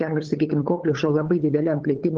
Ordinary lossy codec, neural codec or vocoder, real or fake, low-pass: Opus, 16 kbps; codec, 16 kHz, 8 kbps, FunCodec, trained on LibriTTS, 25 frames a second; fake; 7.2 kHz